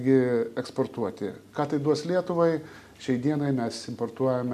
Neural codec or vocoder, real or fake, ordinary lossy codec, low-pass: none; real; MP3, 96 kbps; 14.4 kHz